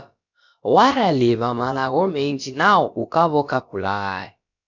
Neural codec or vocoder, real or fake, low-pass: codec, 16 kHz, about 1 kbps, DyCAST, with the encoder's durations; fake; 7.2 kHz